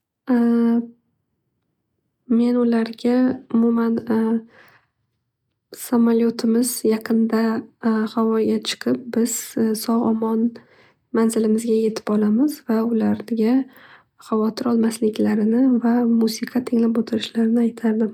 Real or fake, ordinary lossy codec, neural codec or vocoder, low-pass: real; none; none; 19.8 kHz